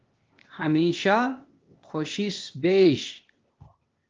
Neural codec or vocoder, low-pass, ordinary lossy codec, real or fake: codec, 16 kHz, 0.8 kbps, ZipCodec; 7.2 kHz; Opus, 24 kbps; fake